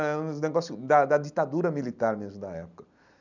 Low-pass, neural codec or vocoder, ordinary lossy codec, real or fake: 7.2 kHz; none; none; real